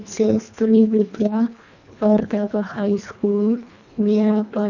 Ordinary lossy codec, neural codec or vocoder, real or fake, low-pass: none; codec, 24 kHz, 1.5 kbps, HILCodec; fake; 7.2 kHz